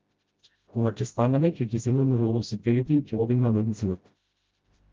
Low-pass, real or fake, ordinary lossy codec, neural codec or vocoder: 7.2 kHz; fake; Opus, 24 kbps; codec, 16 kHz, 0.5 kbps, FreqCodec, smaller model